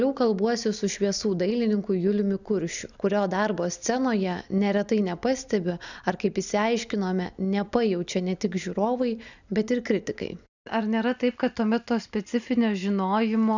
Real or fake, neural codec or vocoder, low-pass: real; none; 7.2 kHz